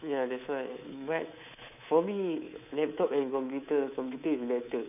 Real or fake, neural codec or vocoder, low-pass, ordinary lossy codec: fake; codec, 24 kHz, 3.1 kbps, DualCodec; 3.6 kHz; none